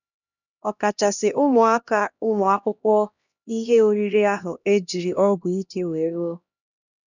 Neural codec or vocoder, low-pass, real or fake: codec, 16 kHz, 1 kbps, X-Codec, HuBERT features, trained on LibriSpeech; 7.2 kHz; fake